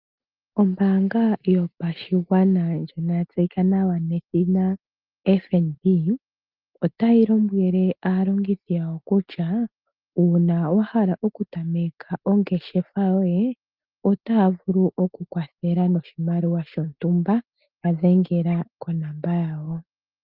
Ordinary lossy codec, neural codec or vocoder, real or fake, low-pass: Opus, 32 kbps; none; real; 5.4 kHz